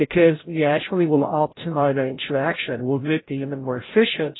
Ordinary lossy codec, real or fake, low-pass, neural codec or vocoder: AAC, 16 kbps; fake; 7.2 kHz; codec, 16 kHz, 0.5 kbps, FreqCodec, larger model